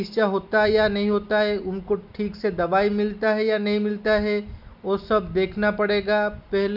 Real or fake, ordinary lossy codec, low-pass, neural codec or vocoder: real; none; 5.4 kHz; none